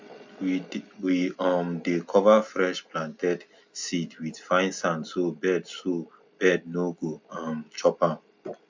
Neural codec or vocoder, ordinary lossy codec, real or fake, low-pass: none; MP3, 64 kbps; real; 7.2 kHz